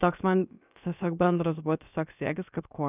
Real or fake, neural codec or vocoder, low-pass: fake; codec, 16 kHz, about 1 kbps, DyCAST, with the encoder's durations; 3.6 kHz